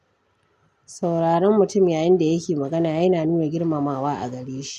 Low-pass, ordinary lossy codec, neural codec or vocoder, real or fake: 14.4 kHz; none; none; real